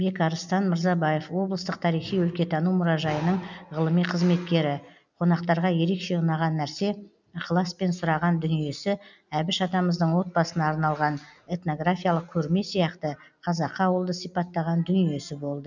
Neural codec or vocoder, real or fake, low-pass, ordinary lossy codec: none; real; 7.2 kHz; none